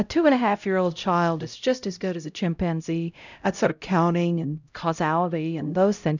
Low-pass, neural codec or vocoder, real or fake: 7.2 kHz; codec, 16 kHz, 0.5 kbps, X-Codec, HuBERT features, trained on LibriSpeech; fake